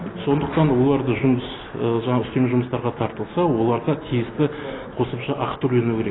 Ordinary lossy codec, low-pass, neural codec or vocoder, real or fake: AAC, 16 kbps; 7.2 kHz; none; real